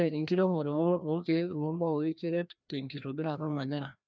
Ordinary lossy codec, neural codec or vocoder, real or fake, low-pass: none; codec, 16 kHz, 1 kbps, FreqCodec, larger model; fake; none